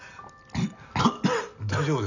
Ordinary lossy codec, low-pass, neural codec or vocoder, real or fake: none; 7.2 kHz; none; real